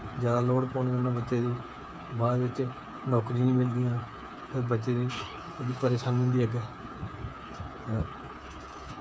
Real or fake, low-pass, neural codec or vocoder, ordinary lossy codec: fake; none; codec, 16 kHz, 8 kbps, FreqCodec, smaller model; none